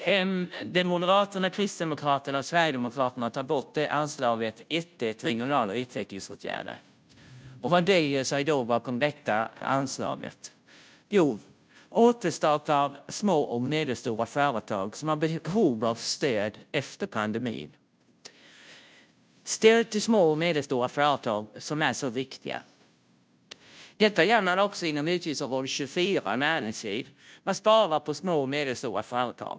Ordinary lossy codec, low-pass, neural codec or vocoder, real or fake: none; none; codec, 16 kHz, 0.5 kbps, FunCodec, trained on Chinese and English, 25 frames a second; fake